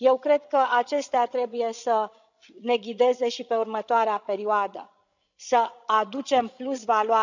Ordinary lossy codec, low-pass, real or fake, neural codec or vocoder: none; 7.2 kHz; fake; codec, 16 kHz, 16 kbps, FreqCodec, larger model